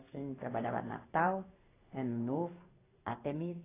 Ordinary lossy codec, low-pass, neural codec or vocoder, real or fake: AAC, 16 kbps; 3.6 kHz; codec, 16 kHz in and 24 kHz out, 1 kbps, XY-Tokenizer; fake